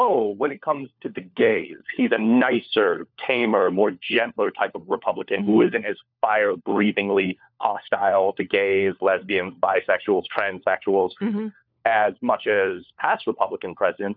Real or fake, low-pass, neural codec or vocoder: fake; 5.4 kHz; codec, 16 kHz, 4 kbps, FunCodec, trained on LibriTTS, 50 frames a second